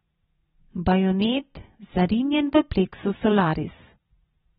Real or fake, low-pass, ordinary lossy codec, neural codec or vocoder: fake; 19.8 kHz; AAC, 16 kbps; vocoder, 44.1 kHz, 128 mel bands every 256 samples, BigVGAN v2